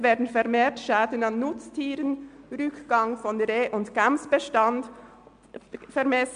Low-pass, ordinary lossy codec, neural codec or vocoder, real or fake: 9.9 kHz; MP3, 64 kbps; none; real